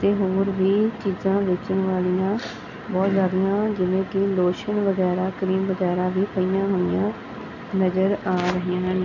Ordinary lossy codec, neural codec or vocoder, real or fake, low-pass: none; none; real; 7.2 kHz